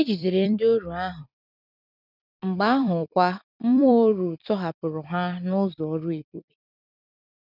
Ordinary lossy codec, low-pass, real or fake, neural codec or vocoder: none; 5.4 kHz; fake; vocoder, 44.1 kHz, 128 mel bands every 256 samples, BigVGAN v2